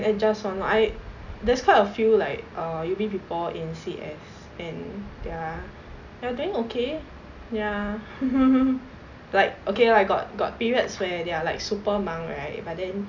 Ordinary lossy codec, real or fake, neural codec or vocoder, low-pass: none; real; none; 7.2 kHz